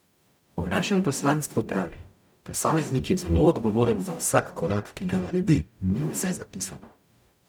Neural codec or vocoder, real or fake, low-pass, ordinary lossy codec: codec, 44.1 kHz, 0.9 kbps, DAC; fake; none; none